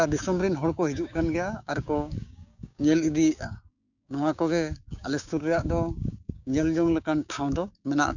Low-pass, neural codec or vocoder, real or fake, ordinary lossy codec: 7.2 kHz; codec, 16 kHz, 6 kbps, DAC; fake; none